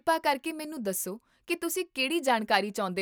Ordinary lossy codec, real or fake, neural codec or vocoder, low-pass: none; real; none; none